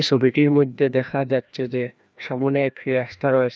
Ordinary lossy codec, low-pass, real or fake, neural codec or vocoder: none; none; fake; codec, 16 kHz, 1 kbps, FreqCodec, larger model